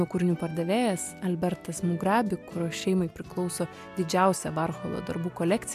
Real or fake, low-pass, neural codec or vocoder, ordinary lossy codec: real; 14.4 kHz; none; MP3, 96 kbps